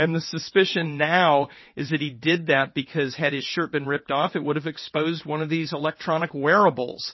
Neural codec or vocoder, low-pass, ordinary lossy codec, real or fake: vocoder, 22.05 kHz, 80 mel bands, WaveNeXt; 7.2 kHz; MP3, 24 kbps; fake